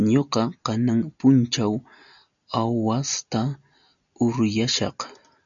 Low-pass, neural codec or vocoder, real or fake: 7.2 kHz; none; real